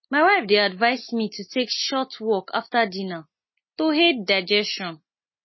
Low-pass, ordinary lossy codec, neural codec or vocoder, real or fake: 7.2 kHz; MP3, 24 kbps; none; real